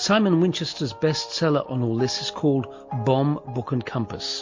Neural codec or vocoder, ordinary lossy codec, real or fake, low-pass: none; MP3, 48 kbps; real; 7.2 kHz